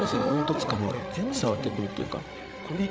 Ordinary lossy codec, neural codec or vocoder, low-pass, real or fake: none; codec, 16 kHz, 16 kbps, FreqCodec, larger model; none; fake